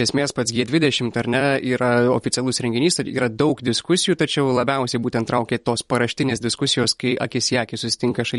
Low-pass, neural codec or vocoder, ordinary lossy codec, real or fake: 19.8 kHz; vocoder, 44.1 kHz, 128 mel bands every 256 samples, BigVGAN v2; MP3, 48 kbps; fake